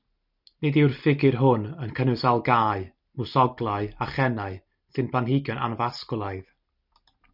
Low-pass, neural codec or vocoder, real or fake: 5.4 kHz; none; real